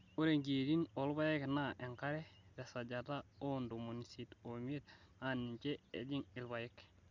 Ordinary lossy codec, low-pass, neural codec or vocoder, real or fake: Opus, 64 kbps; 7.2 kHz; none; real